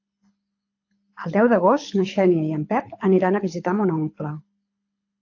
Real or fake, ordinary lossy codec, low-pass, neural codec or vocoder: fake; AAC, 48 kbps; 7.2 kHz; codec, 24 kHz, 6 kbps, HILCodec